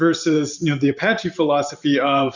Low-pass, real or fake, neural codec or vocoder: 7.2 kHz; fake; vocoder, 44.1 kHz, 128 mel bands every 512 samples, BigVGAN v2